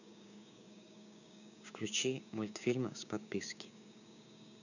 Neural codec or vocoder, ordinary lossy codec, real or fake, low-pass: codec, 16 kHz, 6 kbps, DAC; none; fake; 7.2 kHz